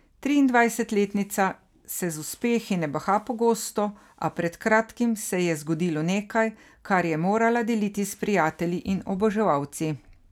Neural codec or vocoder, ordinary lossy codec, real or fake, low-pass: none; none; real; 19.8 kHz